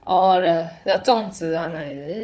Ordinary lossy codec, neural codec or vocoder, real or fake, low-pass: none; codec, 16 kHz, 4 kbps, FunCodec, trained on Chinese and English, 50 frames a second; fake; none